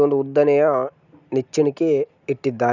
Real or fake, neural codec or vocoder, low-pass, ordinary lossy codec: real; none; 7.2 kHz; none